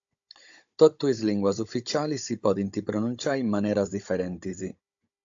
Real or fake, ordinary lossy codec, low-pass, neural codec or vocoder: fake; AAC, 64 kbps; 7.2 kHz; codec, 16 kHz, 16 kbps, FunCodec, trained on Chinese and English, 50 frames a second